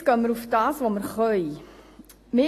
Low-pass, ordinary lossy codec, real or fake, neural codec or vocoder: 14.4 kHz; AAC, 48 kbps; real; none